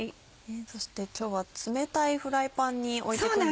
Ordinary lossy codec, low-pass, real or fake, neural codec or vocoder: none; none; real; none